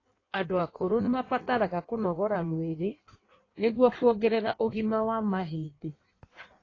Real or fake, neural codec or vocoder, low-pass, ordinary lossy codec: fake; codec, 16 kHz in and 24 kHz out, 1.1 kbps, FireRedTTS-2 codec; 7.2 kHz; AAC, 32 kbps